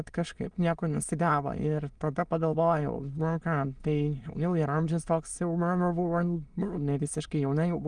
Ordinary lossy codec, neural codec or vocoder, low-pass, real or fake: Opus, 24 kbps; autoencoder, 22.05 kHz, a latent of 192 numbers a frame, VITS, trained on many speakers; 9.9 kHz; fake